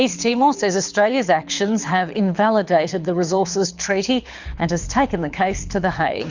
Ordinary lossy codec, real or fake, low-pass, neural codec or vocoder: Opus, 64 kbps; fake; 7.2 kHz; codec, 24 kHz, 6 kbps, HILCodec